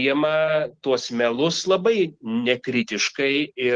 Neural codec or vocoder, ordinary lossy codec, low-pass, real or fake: none; Opus, 16 kbps; 9.9 kHz; real